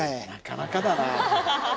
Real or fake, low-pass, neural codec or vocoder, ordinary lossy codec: real; none; none; none